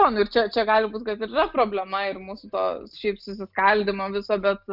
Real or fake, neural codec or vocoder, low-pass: real; none; 5.4 kHz